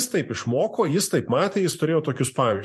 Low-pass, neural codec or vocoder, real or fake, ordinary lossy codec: 14.4 kHz; vocoder, 44.1 kHz, 128 mel bands every 512 samples, BigVGAN v2; fake; MP3, 64 kbps